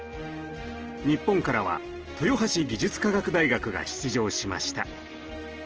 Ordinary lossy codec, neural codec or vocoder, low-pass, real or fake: Opus, 16 kbps; none; 7.2 kHz; real